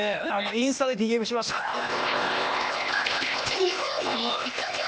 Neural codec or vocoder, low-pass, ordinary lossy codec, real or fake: codec, 16 kHz, 0.8 kbps, ZipCodec; none; none; fake